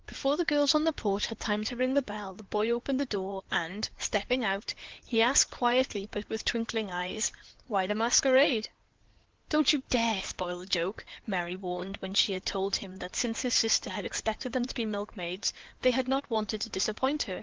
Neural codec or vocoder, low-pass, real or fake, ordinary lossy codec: codec, 16 kHz in and 24 kHz out, 2.2 kbps, FireRedTTS-2 codec; 7.2 kHz; fake; Opus, 16 kbps